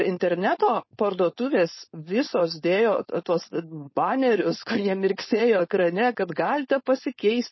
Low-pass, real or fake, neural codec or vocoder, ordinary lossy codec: 7.2 kHz; fake; codec, 16 kHz, 4.8 kbps, FACodec; MP3, 24 kbps